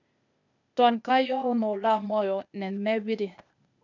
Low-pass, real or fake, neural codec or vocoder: 7.2 kHz; fake; codec, 16 kHz, 0.8 kbps, ZipCodec